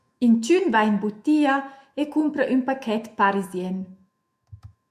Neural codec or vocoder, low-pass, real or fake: autoencoder, 48 kHz, 128 numbers a frame, DAC-VAE, trained on Japanese speech; 14.4 kHz; fake